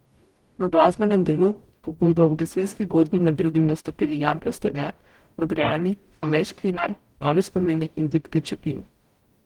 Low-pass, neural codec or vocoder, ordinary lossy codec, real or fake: 19.8 kHz; codec, 44.1 kHz, 0.9 kbps, DAC; Opus, 24 kbps; fake